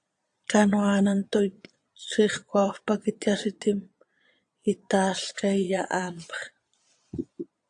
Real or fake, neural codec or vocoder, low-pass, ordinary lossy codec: fake; vocoder, 22.05 kHz, 80 mel bands, Vocos; 9.9 kHz; AAC, 64 kbps